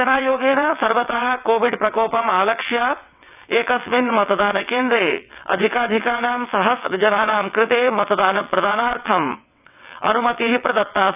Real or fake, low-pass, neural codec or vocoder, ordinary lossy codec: fake; 3.6 kHz; vocoder, 22.05 kHz, 80 mel bands, WaveNeXt; none